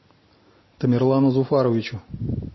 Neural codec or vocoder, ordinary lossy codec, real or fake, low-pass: none; MP3, 24 kbps; real; 7.2 kHz